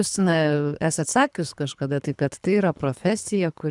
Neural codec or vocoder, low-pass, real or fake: codec, 24 kHz, 3 kbps, HILCodec; 10.8 kHz; fake